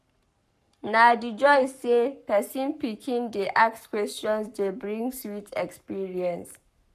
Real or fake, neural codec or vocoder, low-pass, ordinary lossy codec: fake; codec, 44.1 kHz, 7.8 kbps, Pupu-Codec; 14.4 kHz; none